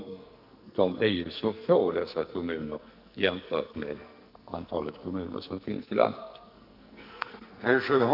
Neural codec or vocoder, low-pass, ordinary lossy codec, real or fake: codec, 44.1 kHz, 2.6 kbps, SNAC; 5.4 kHz; none; fake